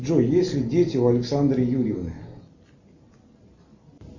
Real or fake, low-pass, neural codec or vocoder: real; 7.2 kHz; none